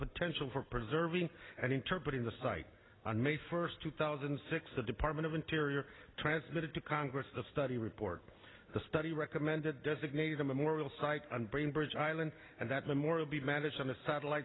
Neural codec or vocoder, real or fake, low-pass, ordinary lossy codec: none; real; 7.2 kHz; AAC, 16 kbps